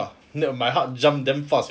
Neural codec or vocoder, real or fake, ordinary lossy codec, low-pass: none; real; none; none